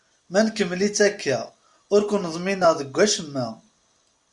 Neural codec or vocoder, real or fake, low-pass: none; real; 10.8 kHz